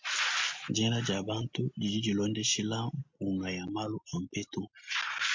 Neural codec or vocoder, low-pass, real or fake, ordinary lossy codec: none; 7.2 kHz; real; MP3, 48 kbps